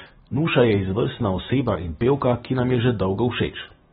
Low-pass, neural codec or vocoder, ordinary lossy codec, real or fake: 19.8 kHz; none; AAC, 16 kbps; real